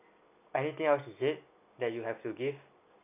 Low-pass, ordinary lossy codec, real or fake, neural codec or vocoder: 3.6 kHz; none; real; none